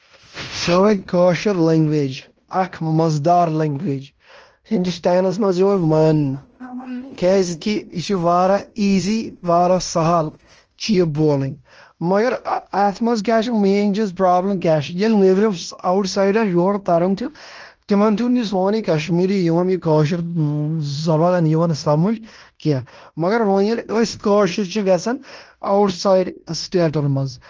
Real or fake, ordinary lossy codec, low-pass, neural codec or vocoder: fake; Opus, 24 kbps; 7.2 kHz; codec, 16 kHz in and 24 kHz out, 0.9 kbps, LongCat-Audio-Codec, fine tuned four codebook decoder